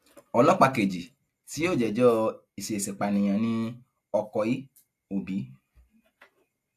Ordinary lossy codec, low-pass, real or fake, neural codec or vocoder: AAC, 64 kbps; 14.4 kHz; real; none